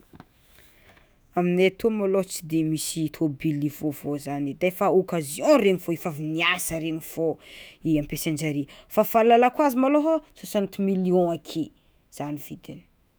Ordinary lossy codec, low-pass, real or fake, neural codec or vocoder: none; none; fake; autoencoder, 48 kHz, 128 numbers a frame, DAC-VAE, trained on Japanese speech